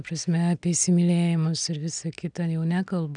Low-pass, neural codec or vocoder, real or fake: 9.9 kHz; none; real